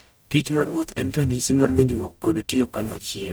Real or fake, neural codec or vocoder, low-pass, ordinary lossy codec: fake; codec, 44.1 kHz, 0.9 kbps, DAC; none; none